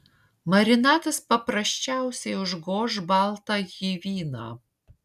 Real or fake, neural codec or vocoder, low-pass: real; none; 14.4 kHz